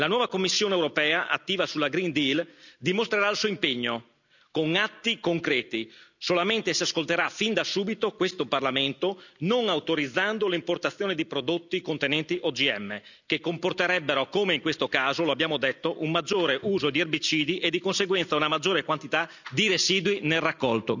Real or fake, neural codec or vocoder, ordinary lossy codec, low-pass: real; none; none; 7.2 kHz